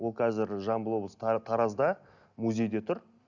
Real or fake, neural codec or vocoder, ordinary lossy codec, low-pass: real; none; none; 7.2 kHz